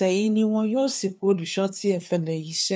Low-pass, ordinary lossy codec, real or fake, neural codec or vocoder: none; none; fake; codec, 16 kHz, 2 kbps, FunCodec, trained on LibriTTS, 25 frames a second